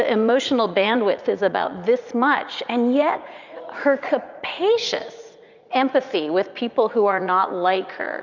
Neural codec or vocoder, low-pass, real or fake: vocoder, 44.1 kHz, 80 mel bands, Vocos; 7.2 kHz; fake